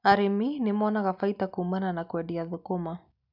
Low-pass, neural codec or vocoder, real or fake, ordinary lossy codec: 5.4 kHz; none; real; none